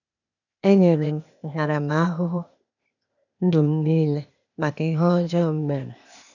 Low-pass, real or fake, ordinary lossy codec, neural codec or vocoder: 7.2 kHz; fake; none; codec, 16 kHz, 0.8 kbps, ZipCodec